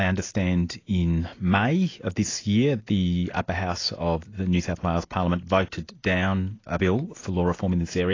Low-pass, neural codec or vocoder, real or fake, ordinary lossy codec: 7.2 kHz; none; real; AAC, 32 kbps